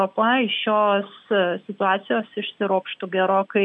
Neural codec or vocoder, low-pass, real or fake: none; 10.8 kHz; real